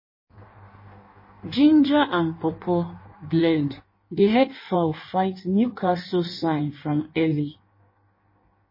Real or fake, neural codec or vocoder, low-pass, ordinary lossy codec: fake; codec, 16 kHz in and 24 kHz out, 1.1 kbps, FireRedTTS-2 codec; 5.4 kHz; MP3, 24 kbps